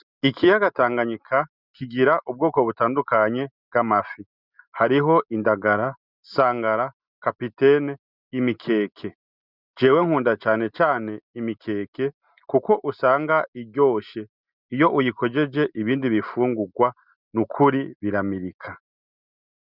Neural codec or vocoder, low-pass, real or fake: none; 5.4 kHz; real